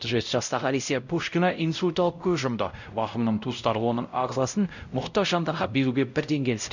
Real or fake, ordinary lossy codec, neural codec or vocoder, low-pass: fake; none; codec, 16 kHz, 0.5 kbps, X-Codec, WavLM features, trained on Multilingual LibriSpeech; 7.2 kHz